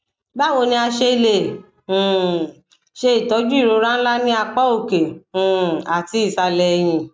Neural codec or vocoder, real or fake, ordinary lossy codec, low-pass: none; real; none; none